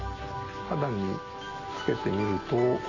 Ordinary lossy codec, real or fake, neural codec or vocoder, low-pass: none; real; none; 7.2 kHz